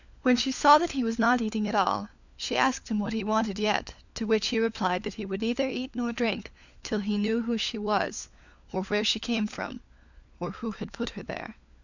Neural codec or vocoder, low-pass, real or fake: codec, 16 kHz, 4 kbps, FunCodec, trained on LibriTTS, 50 frames a second; 7.2 kHz; fake